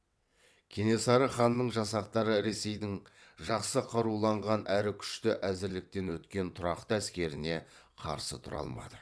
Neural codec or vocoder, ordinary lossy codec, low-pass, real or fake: vocoder, 22.05 kHz, 80 mel bands, WaveNeXt; none; none; fake